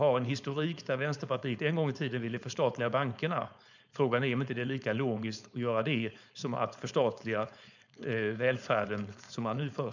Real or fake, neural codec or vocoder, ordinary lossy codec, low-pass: fake; codec, 16 kHz, 4.8 kbps, FACodec; none; 7.2 kHz